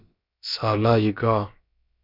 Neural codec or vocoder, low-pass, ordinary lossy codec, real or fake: codec, 16 kHz, about 1 kbps, DyCAST, with the encoder's durations; 5.4 kHz; MP3, 48 kbps; fake